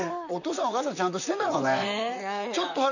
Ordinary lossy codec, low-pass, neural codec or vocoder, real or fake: none; 7.2 kHz; vocoder, 44.1 kHz, 128 mel bands, Pupu-Vocoder; fake